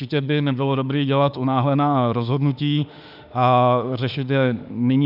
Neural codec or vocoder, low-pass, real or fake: autoencoder, 48 kHz, 32 numbers a frame, DAC-VAE, trained on Japanese speech; 5.4 kHz; fake